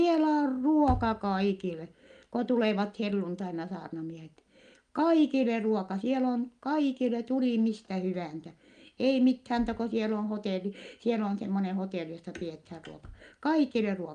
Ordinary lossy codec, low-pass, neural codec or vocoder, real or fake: Opus, 32 kbps; 9.9 kHz; none; real